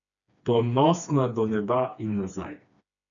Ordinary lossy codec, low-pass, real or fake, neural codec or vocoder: none; 7.2 kHz; fake; codec, 16 kHz, 2 kbps, FreqCodec, smaller model